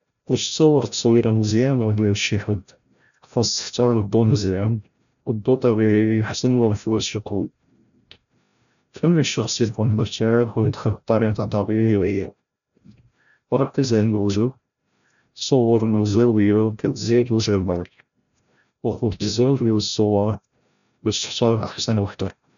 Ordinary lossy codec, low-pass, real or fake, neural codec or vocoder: none; 7.2 kHz; fake; codec, 16 kHz, 0.5 kbps, FreqCodec, larger model